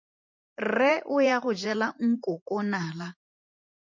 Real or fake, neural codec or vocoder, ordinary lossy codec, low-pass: fake; vocoder, 44.1 kHz, 128 mel bands every 512 samples, BigVGAN v2; MP3, 48 kbps; 7.2 kHz